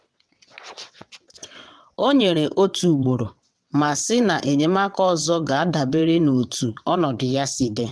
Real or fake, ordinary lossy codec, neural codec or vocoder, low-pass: fake; none; vocoder, 22.05 kHz, 80 mel bands, WaveNeXt; none